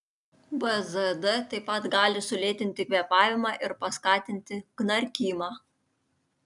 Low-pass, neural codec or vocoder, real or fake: 10.8 kHz; none; real